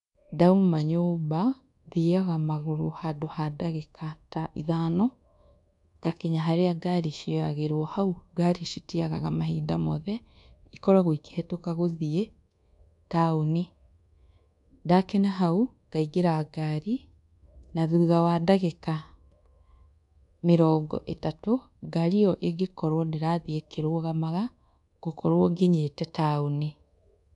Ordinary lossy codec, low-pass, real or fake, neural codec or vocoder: none; 10.8 kHz; fake; codec, 24 kHz, 1.2 kbps, DualCodec